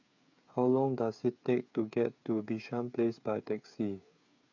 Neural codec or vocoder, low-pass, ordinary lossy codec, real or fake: codec, 16 kHz, 8 kbps, FreqCodec, smaller model; 7.2 kHz; none; fake